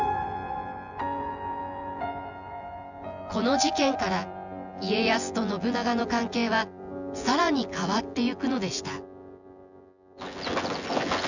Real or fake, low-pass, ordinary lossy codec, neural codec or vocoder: fake; 7.2 kHz; none; vocoder, 24 kHz, 100 mel bands, Vocos